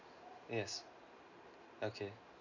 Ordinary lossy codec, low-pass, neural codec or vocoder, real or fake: none; 7.2 kHz; none; real